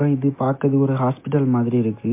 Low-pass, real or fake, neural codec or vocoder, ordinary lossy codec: 3.6 kHz; real; none; MP3, 24 kbps